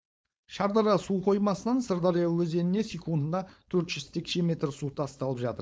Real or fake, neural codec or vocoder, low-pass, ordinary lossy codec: fake; codec, 16 kHz, 4.8 kbps, FACodec; none; none